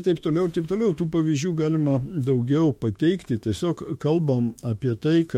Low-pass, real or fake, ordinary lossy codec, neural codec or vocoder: 19.8 kHz; fake; MP3, 64 kbps; autoencoder, 48 kHz, 32 numbers a frame, DAC-VAE, trained on Japanese speech